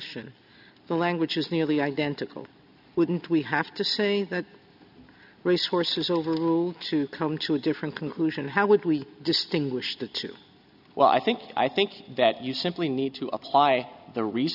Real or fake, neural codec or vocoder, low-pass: real; none; 5.4 kHz